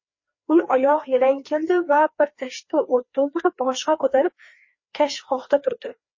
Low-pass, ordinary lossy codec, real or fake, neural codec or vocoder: 7.2 kHz; MP3, 32 kbps; fake; codec, 16 kHz, 2 kbps, FreqCodec, larger model